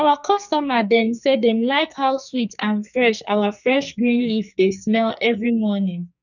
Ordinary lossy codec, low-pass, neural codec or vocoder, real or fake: none; 7.2 kHz; codec, 44.1 kHz, 2.6 kbps, SNAC; fake